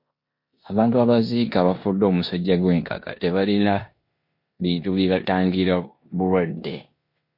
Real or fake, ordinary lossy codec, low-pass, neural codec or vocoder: fake; MP3, 32 kbps; 5.4 kHz; codec, 16 kHz in and 24 kHz out, 0.9 kbps, LongCat-Audio-Codec, four codebook decoder